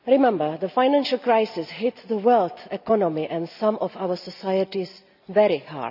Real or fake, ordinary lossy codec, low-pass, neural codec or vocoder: real; none; 5.4 kHz; none